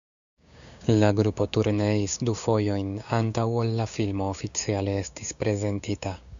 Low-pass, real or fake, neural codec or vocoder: 7.2 kHz; fake; codec, 16 kHz, 6 kbps, DAC